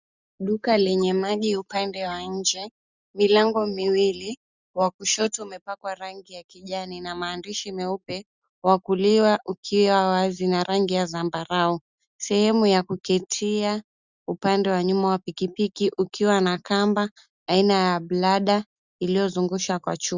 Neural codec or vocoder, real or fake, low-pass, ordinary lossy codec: none; real; 7.2 kHz; Opus, 64 kbps